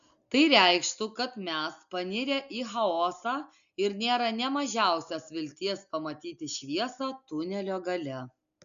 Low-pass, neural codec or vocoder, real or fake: 7.2 kHz; none; real